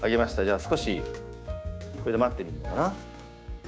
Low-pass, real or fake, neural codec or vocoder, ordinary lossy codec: none; fake; codec, 16 kHz, 6 kbps, DAC; none